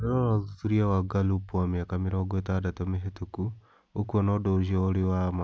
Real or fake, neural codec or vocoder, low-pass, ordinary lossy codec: real; none; none; none